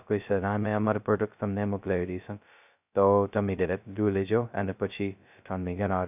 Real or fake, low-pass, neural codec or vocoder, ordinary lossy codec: fake; 3.6 kHz; codec, 16 kHz, 0.2 kbps, FocalCodec; none